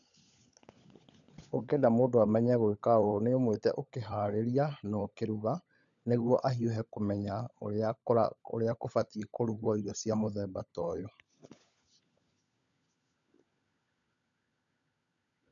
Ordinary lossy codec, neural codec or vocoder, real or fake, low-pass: none; codec, 16 kHz, 16 kbps, FunCodec, trained on LibriTTS, 50 frames a second; fake; 7.2 kHz